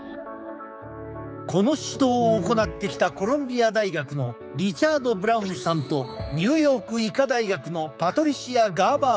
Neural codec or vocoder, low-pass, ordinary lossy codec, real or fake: codec, 16 kHz, 4 kbps, X-Codec, HuBERT features, trained on general audio; none; none; fake